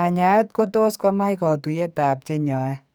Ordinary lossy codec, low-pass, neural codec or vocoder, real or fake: none; none; codec, 44.1 kHz, 2.6 kbps, SNAC; fake